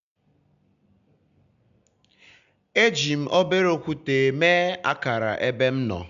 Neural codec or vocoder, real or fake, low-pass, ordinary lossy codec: none; real; 7.2 kHz; AAC, 96 kbps